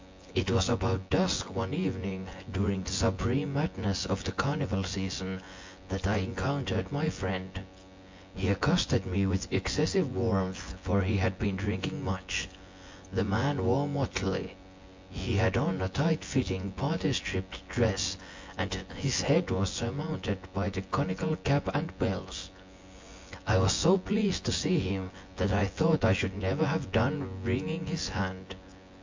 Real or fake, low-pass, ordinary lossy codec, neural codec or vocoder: fake; 7.2 kHz; MP3, 48 kbps; vocoder, 24 kHz, 100 mel bands, Vocos